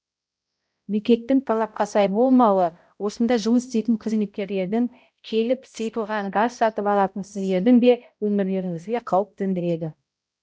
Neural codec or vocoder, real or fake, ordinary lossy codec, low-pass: codec, 16 kHz, 0.5 kbps, X-Codec, HuBERT features, trained on balanced general audio; fake; none; none